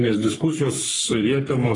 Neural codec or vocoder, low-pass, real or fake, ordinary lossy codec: codec, 44.1 kHz, 3.4 kbps, Pupu-Codec; 10.8 kHz; fake; AAC, 32 kbps